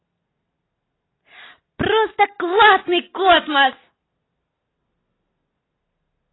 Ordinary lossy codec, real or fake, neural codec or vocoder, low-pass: AAC, 16 kbps; real; none; 7.2 kHz